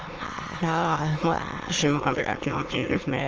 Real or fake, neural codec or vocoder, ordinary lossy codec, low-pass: fake; autoencoder, 22.05 kHz, a latent of 192 numbers a frame, VITS, trained on many speakers; Opus, 24 kbps; 7.2 kHz